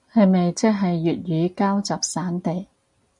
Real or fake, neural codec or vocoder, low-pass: real; none; 10.8 kHz